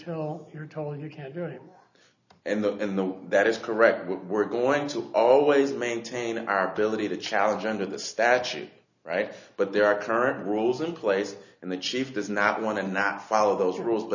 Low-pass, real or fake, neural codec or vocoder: 7.2 kHz; real; none